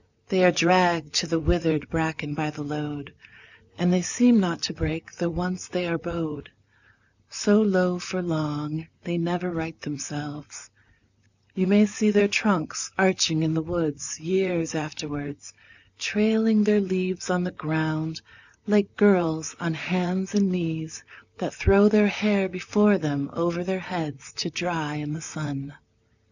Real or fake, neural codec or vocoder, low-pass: fake; vocoder, 44.1 kHz, 128 mel bands, Pupu-Vocoder; 7.2 kHz